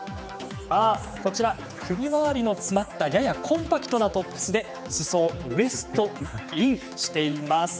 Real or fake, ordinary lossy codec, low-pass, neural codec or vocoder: fake; none; none; codec, 16 kHz, 4 kbps, X-Codec, HuBERT features, trained on general audio